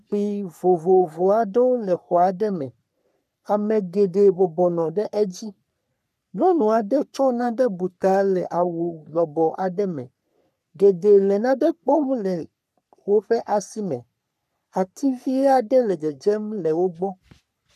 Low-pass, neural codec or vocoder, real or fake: 14.4 kHz; codec, 44.1 kHz, 3.4 kbps, Pupu-Codec; fake